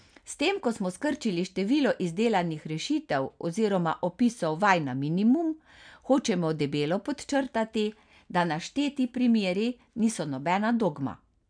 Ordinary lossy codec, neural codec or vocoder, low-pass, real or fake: MP3, 96 kbps; none; 9.9 kHz; real